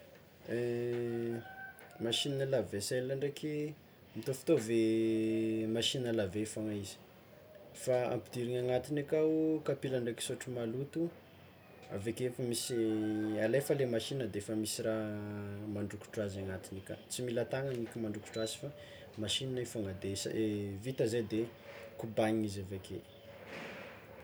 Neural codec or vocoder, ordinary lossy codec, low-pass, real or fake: none; none; none; real